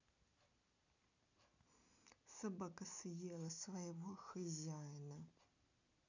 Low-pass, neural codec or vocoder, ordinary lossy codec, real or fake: 7.2 kHz; none; none; real